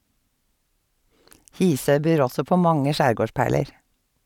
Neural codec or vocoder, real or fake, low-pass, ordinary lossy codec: none; real; 19.8 kHz; none